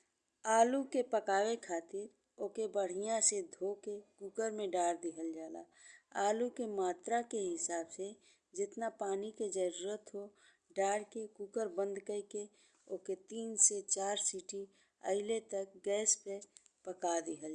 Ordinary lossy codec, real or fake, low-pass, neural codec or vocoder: Opus, 64 kbps; real; 9.9 kHz; none